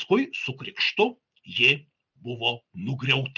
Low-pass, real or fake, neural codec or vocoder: 7.2 kHz; real; none